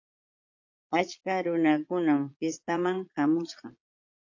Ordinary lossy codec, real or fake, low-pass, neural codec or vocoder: AAC, 48 kbps; fake; 7.2 kHz; vocoder, 22.05 kHz, 80 mel bands, Vocos